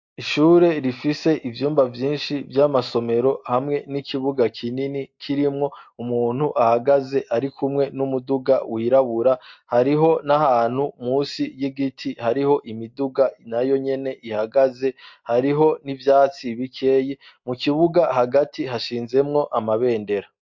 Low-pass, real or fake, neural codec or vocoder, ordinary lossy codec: 7.2 kHz; real; none; MP3, 48 kbps